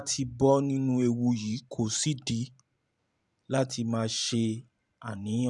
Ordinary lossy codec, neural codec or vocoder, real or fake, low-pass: none; vocoder, 44.1 kHz, 128 mel bands every 512 samples, BigVGAN v2; fake; 10.8 kHz